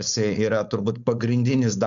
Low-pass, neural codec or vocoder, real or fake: 7.2 kHz; codec, 16 kHz, 4.8 kbps, FACodec; fake